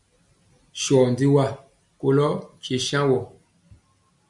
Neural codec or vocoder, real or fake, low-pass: none; real; 10.8 kHz